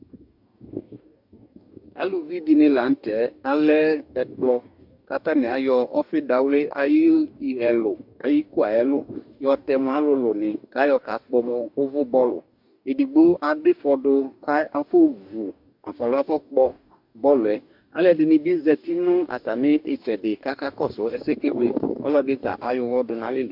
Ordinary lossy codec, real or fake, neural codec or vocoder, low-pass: MP3, 48 kbps; fake; codec, 44.1 kHz, 2.6 kbps, DAC; 5.4 kHz